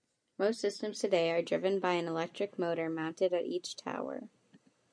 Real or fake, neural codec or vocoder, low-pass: real; none; 9.9 kHz